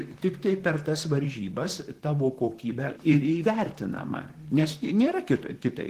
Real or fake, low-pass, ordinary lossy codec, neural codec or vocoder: fake; 14.4 kHz; Opus, 16 kbps; vocoder, 44.1 kHz, 128 mel bands, Pupu-Vocoder